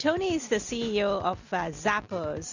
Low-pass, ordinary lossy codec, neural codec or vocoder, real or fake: 7.2 kHz; Opus, 64 kbps; none; real